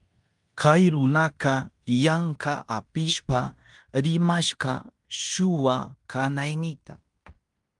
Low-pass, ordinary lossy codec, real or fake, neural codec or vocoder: 10.8 kHz; Opus, 32 kbps; fake; codec, 16 kHz in and 24 kHz out, 0.9 kbps, LongCat-Audio-Codec, fine tuned four codebook decoder